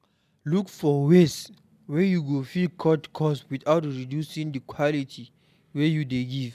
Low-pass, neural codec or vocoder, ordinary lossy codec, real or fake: 14.4 kHz; none; none; real